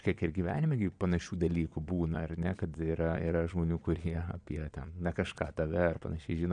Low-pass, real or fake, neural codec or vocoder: 9.9 kHz; fake; vocoder, 22.05 kHz, 80 mel bands, Vocos